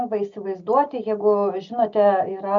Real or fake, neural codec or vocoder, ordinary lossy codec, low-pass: real; none; MP3, 64 kbps; 7.2 kHz